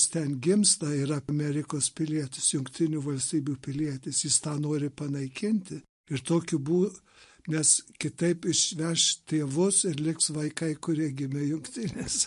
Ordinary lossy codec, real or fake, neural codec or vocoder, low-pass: MP3, 48 kbps; real; none; 10.8 kHz